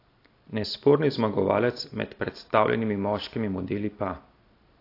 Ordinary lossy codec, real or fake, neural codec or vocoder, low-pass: AAC, 32 kbps; real; none; 5.4 kHz